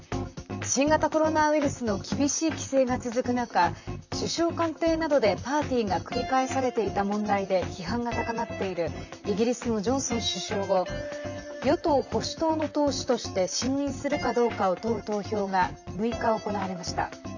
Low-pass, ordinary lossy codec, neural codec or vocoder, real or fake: 7.2 kHz; none; vocoder, 44.1 kHz, 128 mel bands, Pupu-Vocoder; fake